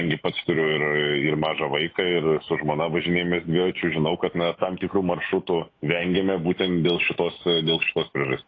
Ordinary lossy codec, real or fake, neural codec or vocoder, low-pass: AAC, 32 kbps; fake; autoencoder, 48 kHz, 128 numbers a frame, DAC-VAE, trained on Japanese speech; 7.2 kHz